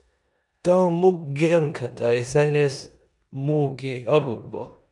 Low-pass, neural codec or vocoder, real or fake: 10.8 kHz; codec, 16 kHz in and 24 kHz out, 0.9 kbps, LongCat-Audio-Codec, four codebook decoder; fake